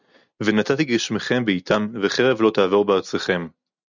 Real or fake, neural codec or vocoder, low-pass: real; none; 7.2 kHz